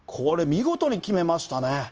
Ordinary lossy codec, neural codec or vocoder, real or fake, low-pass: Opus, 24 kbps; codec, 16 kHz, 0.9 kbps, LongCat-Audio-Codec; fake; 7.2 kHz